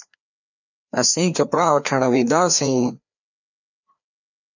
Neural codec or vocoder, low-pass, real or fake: codec, 16 kHz, 2 kbps, FreqCodec, larger model; 7.2 kHz; fake